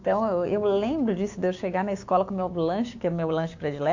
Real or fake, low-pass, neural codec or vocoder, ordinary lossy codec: fake; 7.2 kHz; codec, 16 kHz, 6 kbps, DAC; AAC, 48 kbps